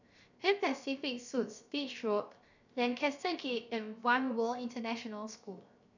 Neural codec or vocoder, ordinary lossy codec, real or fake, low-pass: codec, 16 kHz, 0.7 kbps, FocalCodec; none; fake; 7.2 kHz